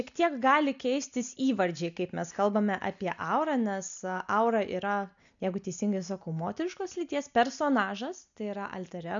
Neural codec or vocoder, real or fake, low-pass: none; real; 7.2 kHz